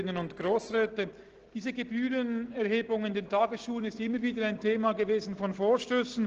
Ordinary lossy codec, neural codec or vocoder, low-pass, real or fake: Opus, 16 kbps; none; 7.2 kHz; real